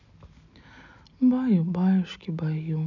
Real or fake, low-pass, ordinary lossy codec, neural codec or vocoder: real; 7.2 kHz; none; none